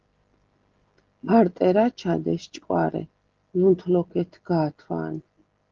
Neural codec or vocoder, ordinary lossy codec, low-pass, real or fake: none; Opus, 16 kbps; 7.2 kHz; real